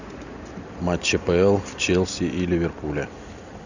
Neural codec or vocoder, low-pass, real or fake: none; 7.2 kHz; real